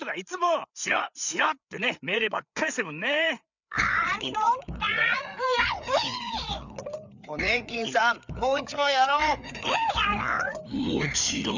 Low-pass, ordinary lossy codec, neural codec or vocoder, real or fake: 7.2 kHz; none; codec, 16 kHz, 4 kbps, FreqCodec, larger model; fake